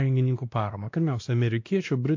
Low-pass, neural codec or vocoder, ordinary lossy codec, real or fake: 7.2 kHz; codec, 16 kHz, 1 kbps, X-Codec, WavLM features, trained on Multilingual LibriSpeech; AAC, 48 kbps; fake